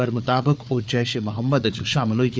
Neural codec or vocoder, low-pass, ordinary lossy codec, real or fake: codec, 16 kHz, 4 kbps, FunCodec, trained on Chinese and English, 50 frames a second; none; none; fake